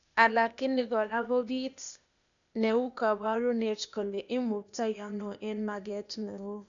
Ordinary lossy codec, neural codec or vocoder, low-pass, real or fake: none; codec, 16 kHz, 0.8 kbps, ZipCodec; 7.2 kHz; fake